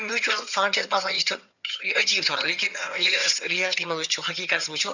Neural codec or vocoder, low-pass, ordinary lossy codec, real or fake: vocoder, 22.05 kHz, 80 mel bands, HiFi-GAN; 7.2 kHz; none; fake